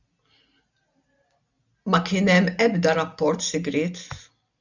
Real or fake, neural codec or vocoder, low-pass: real; none; 7.2 kHz